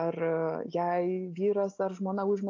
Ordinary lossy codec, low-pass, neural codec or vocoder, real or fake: AAC, 48 kbps; 7.2 kHz; none; real